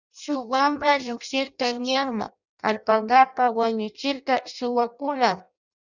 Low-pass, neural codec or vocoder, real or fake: 7.2 kHz; codec, 16 kHz in and 24 kHz out, 0.6 kbps, FireRedTTS-2 codec; fake